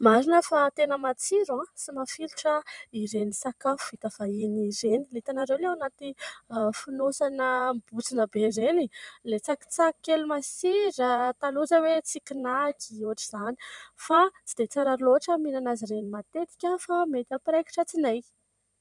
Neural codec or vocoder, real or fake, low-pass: vocoder, 44.1 kHz, 128 mel bands every 512 samples, BigVGAN v2; fake; 10.8 kHz